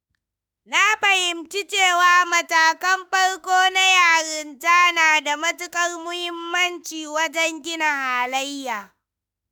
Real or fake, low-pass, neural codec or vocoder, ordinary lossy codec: fake; none; autoencoder, 48 kHz, 32 numbers a frame, DAC-VAE, trained on Japanese speech; none